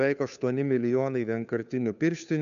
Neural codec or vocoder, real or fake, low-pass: codec, 16 kHz, 2 kbps, FunCodec, trained on Chinese and English, 25 frames a second; fake; 7.2 kHz